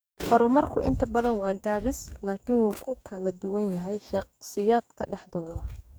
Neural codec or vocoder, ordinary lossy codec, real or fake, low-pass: codec, 44.1 kHz, 2.6 kbps, DAC; none; fake; none